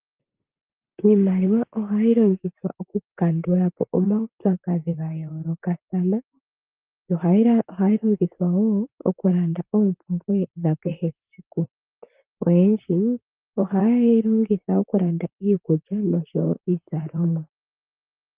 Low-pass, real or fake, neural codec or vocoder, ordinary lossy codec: 3.6 kHz; fake; vocoder, 44.1 kHz, 128 mel bands, Pupu-Vocoder; Opus, 32 kbps